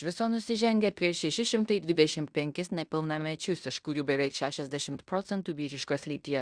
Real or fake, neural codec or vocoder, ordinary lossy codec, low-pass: fake; codec, 16 kHz in and 24 kHz out, 0.9 kbps, LongCat-Audio-Codec, fine tuned four codebook decoder; Opus, 64 kbps; 9.9 kHz